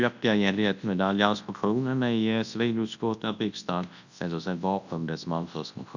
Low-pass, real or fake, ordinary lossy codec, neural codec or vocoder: 7.2 kHz; fake; none; codec, 24 kHz, 0.9 kbps, WavTokenizer, large speech release